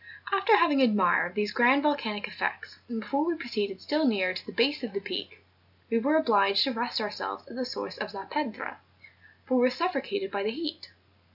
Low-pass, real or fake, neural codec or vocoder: 5.4 kHz; real; none